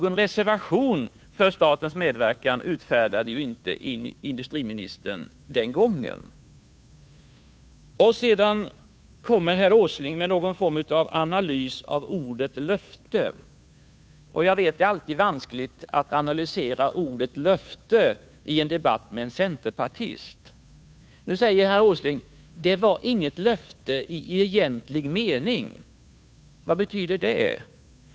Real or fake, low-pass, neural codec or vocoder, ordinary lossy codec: fake; none; codec, 16 kHz, 2 kbps, FunCodec, trained on Chinese and English, 25 frames a second; none